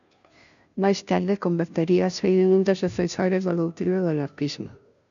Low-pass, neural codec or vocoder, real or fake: 7.2 kHz; codec, 16 kHz, 0.5 kbps, FunCodec, trained on Chinese and English, 25 frames a second; fake